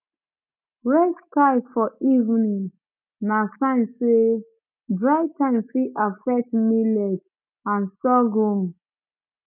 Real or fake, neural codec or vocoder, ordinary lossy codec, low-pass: real; none; none; 3.6 kHz